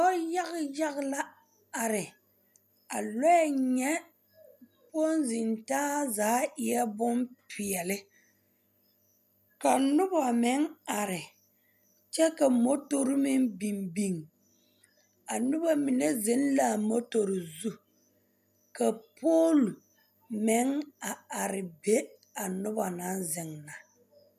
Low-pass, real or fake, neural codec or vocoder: 14.4 kHz; real; none